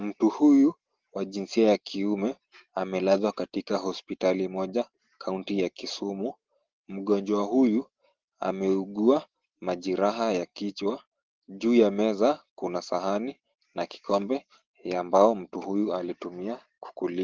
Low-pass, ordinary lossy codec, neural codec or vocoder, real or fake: 7.2 kHz; Opus, 16 kbps; none; real